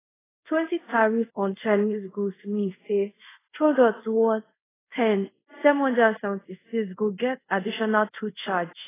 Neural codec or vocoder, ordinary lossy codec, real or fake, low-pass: codec, 24 kHz, 0.5 kbps, DualCodec; AAC, 16 kbps; fake; 3.6 kHz